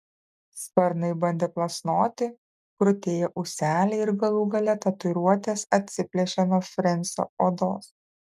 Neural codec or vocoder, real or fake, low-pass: none; real; 14.4 kHz